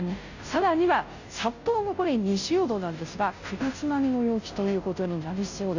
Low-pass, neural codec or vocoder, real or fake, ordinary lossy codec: 7.2 kHz; codec, 16 kHz, 0.5 kbps, FunCodec, trained on Chinese and English, 25 frames a second; fake; none